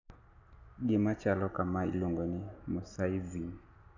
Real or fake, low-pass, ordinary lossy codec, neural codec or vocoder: real; 7.2 kHz; none; none